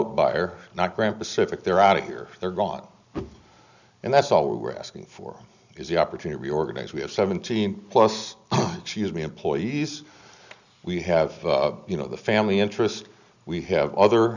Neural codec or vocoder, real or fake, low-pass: none; real; 7.2 kHz